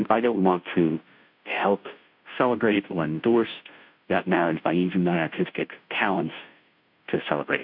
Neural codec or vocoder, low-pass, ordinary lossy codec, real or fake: codec, 16 kHz, 0.5 kbps, FunCodec, trained on Chinese and English, 25 frames a second; 5.4 kHz; MP3, 48 kbps; fake